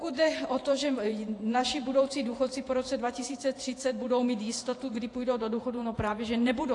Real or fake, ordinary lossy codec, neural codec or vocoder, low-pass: fake; AAC, 48 kbps; vocoder, 48 kHz, 128 mel bands, Vocos; 10.8 kHz